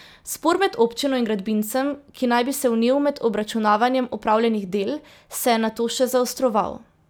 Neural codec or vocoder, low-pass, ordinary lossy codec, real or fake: none; none; none; real